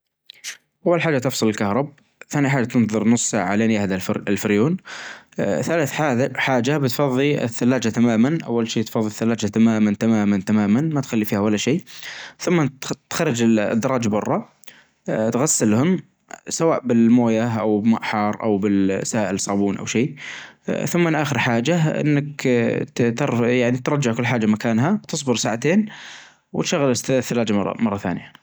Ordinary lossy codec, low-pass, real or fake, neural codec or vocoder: none; none; real; none